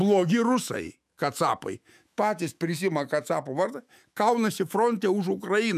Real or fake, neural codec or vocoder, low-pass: fake; autoencoder, 48 kHz, 128 numbers a frame, DAC-VAE, trained on Japanese speech; 14.4 kHz